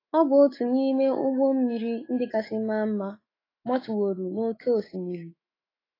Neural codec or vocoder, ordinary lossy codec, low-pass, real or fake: autoencoder, 48 kHz, 128 numbers a frame, DAC-VAE, trained on Japanese speech; AAC, 24 kbps; 5.4 kHz; fake